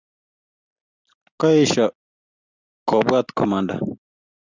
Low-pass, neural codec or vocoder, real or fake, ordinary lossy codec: 7.2 kHz; none; real; Opus, 64 kbps